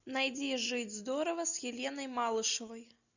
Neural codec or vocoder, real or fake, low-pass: none; real; 7.2 kHz